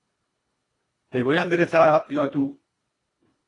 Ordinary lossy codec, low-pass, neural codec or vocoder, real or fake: AAC, 32 kbps; 10.8 kHz; codec, 24 kHz, 1.5 kbps, HILCodec; fake